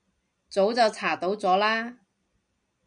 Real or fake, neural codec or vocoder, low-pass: real; none; 9.9 kHz